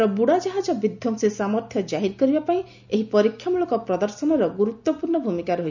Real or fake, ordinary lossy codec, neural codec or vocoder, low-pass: real; none; none; 7.2 kHz